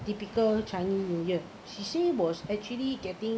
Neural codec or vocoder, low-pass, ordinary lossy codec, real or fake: none; none; none; real